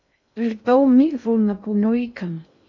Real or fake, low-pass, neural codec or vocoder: fake; 7.2 kHz; codec, 16 kHz in and 24 kHz out, 0.6 kbps, FocalCodec, streaming, 2048 codes